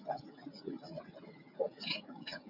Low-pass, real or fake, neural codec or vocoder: 5.4 kHz; fake; codec, 16 kHz, 16 kbps, FreqCodec, smaller model